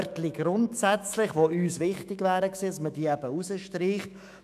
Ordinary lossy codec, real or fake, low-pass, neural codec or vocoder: none; fake; 14.4 kHz; autoencoder, 48 kHz, 128 numbers a frame, DAC-VAE, trained on Japanese speech